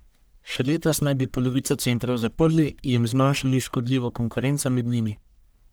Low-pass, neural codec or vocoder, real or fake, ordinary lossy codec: none; codec, 44.1 kHz, 1.7 kbps, Pupu-Codec; fake; none